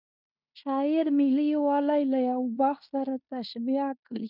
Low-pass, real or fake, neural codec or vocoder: 5.4 kHz; fake; codec, 16 kHz in and 24 kHz out, 0.9 kbps, LongCat-Audio-Codec, fine tuned four codebook decoder